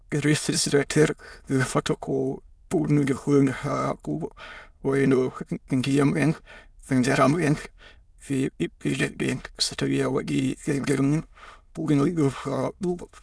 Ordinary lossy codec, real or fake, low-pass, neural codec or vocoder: none; fake; none; autoencoder, 22.05 kHz, a latent of 192 numbers a frame, VITS, trained on many speakers